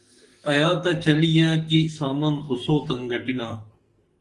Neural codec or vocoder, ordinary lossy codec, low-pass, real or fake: codec, 44.1 kHz, 2.6 kbps, SNAC; Opus, 24 kbps; 10.8 kHz; fake